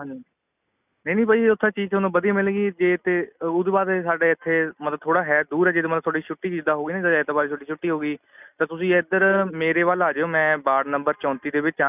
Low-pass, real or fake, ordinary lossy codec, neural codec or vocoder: 3.6 kHz; real; none; none